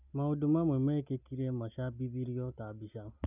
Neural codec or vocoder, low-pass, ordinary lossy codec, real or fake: none; 3.6 kHz; none; real